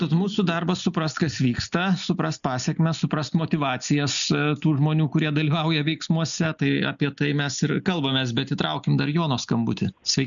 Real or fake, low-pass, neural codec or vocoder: real; 7.2 kHz; none